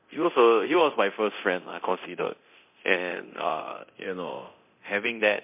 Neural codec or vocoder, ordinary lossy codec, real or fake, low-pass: codec, 24 kHz, 0.9 kbps, DualCodec; MP3, 32 kbps; fake; 3.6 kHz